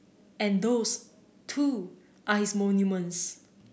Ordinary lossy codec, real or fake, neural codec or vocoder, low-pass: none; real; none; none